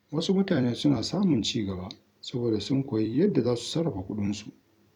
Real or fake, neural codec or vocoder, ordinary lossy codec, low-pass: fake; vocoder, 44.1 kHz, 128 mel bands every 256 samples, BigVGAN v2; none; 19.8 kHz